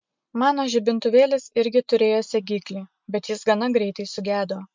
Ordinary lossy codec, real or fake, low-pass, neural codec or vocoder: MP3, 64 kbps; real; 7.2 kHz; none